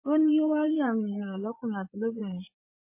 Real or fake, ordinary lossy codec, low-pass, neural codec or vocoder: fake; MP3, 24 kbps; 3.6 kHz; vocoder, 24 kHz, 100 mel bands, Vocos